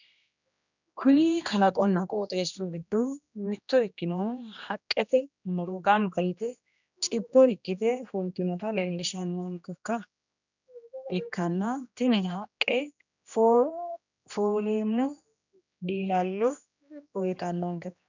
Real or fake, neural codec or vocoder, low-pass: fake; codec, 16 kHz, 1 kbps, X-Codec, HuBERT features, trained on general audio; 7.2 kHz